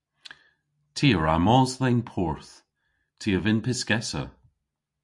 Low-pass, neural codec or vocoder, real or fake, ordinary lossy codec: 10.8 kHz; none; real; MP3, 64 kbps